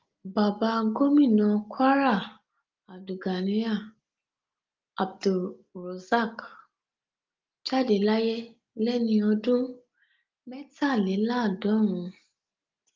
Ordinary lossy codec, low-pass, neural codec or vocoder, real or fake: Opus, 32 kbps; 7.2 kHz; none; real